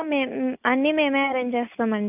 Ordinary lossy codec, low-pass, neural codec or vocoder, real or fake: AAC, 32 kbps; 3.6 kHz; none; real